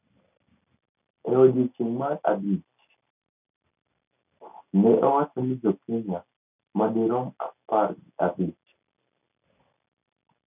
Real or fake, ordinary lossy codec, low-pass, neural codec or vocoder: real; none; 3.6 kHz; none